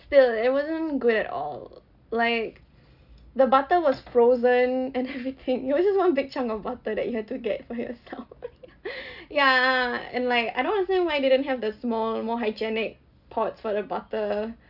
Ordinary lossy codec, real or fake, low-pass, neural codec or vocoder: none; real; 5.4 kHz; none